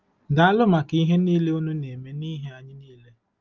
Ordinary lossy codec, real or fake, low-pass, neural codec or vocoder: Opus, 32 kbps; real; 7.2 kHz; none